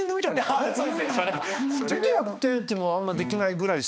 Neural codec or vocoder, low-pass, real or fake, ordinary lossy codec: codec, 16 kHz, 2 kbps, X-Codec, HuBERT features, trained on balanced general audio; none; fake; none